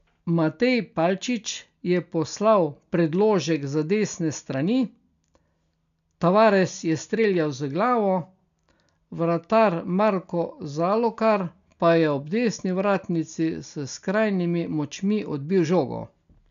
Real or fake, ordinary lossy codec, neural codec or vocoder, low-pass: real; MP3, 96 kbps; none; 7.2 kHz